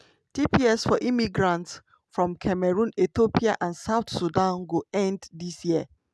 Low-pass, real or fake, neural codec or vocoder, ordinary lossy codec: none; real; none; none